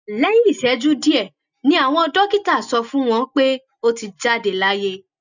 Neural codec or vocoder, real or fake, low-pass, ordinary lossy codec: none; real; 7.2 kHz; none